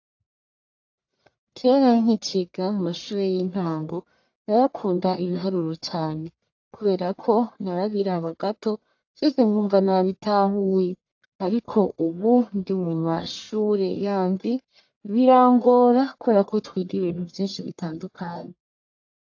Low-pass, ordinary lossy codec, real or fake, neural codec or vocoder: 7.2 kHz; AAC, 48 kbps; fake; codec, 44.1 kHz, 1.7 kbps, Pupu-Codec